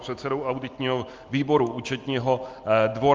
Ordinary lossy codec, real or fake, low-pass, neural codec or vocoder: Opus, 32 kbps; real; 7.2 kHz; none